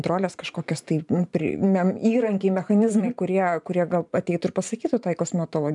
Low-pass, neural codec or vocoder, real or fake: 10.8 kHz; vocoder, 44.1 kHz, 128 mel bands every 512 samples, BigVGAN v2; fake